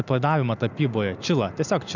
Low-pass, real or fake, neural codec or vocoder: 7.2 kHz; real; none